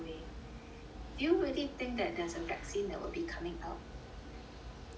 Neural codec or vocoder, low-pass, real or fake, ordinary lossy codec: none; none; real; none